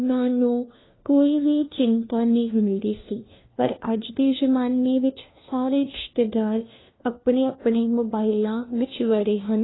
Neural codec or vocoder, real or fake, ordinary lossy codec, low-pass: codec, 16 kHz, 1 kbps, FunCodec, trained on LibriTTS, 50 frames a second; fake; AAC, 16 kbps; 7.2 kHz